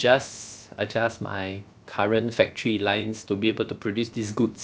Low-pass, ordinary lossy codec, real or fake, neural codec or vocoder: none; none; fake; codec, 16 kHz, about 1 kbps, DyCAST, with the encoder's durations